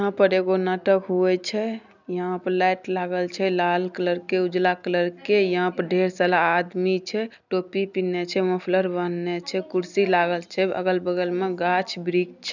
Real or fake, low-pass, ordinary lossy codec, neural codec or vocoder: real; 7.2 kHz; none; none